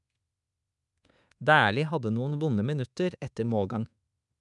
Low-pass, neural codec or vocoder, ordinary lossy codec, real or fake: 10.8 kHz; codec, 24 kHz, 1.2 kbps, DualCodec; none; fake